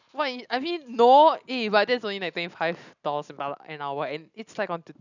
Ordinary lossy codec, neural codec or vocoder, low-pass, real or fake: none; none; 7.2 kHz; real